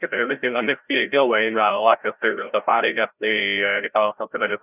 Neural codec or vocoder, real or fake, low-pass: codec, 16 kHz, 0.5 kbps, FreqCodec, larger model; fake; 3.6 kHz